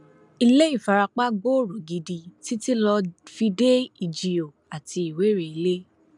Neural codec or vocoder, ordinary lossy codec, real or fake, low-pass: none; none; real; 10.8 kHz